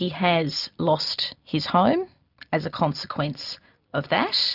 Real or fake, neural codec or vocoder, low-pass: real; none; 5.4 kHz